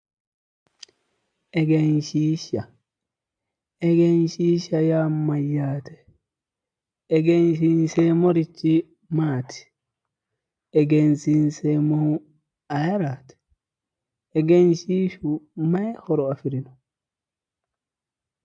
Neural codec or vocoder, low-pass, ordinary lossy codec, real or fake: none; 9.9 kHz; AAC, 64 kbps; real